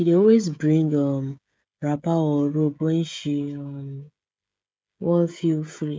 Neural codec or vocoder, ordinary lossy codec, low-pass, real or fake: codec, 16 kHz, 16 kbps, FreqCodec, smaller model; none; none; fake